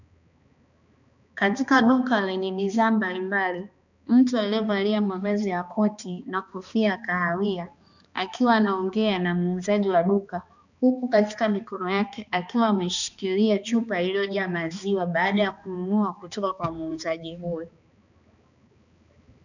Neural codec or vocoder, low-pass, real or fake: codec, 16 kHz, 2 kbps, X-Codec, HuBERT features, trained on balanced general audio; 7.2 kHz; fake